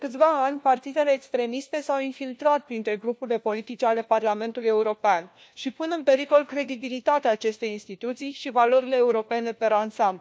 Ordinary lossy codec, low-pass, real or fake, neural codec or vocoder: none; none; fake; codec, 16 kHz, 1 kbps, FunCodec, trained on LibriTTS, 50 frames a second